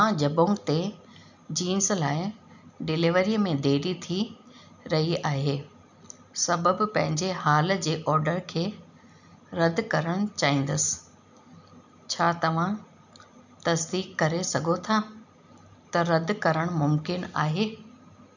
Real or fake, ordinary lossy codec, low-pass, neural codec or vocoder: real; none; 7.2 kHz; none